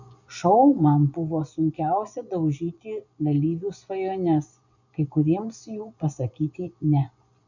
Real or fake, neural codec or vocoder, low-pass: fake; vocoder, 24 kHz, 100 mel bands, Vocos; 7.2 kHz